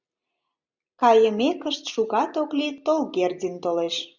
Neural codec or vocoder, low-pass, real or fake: none; 7.2 kHz; real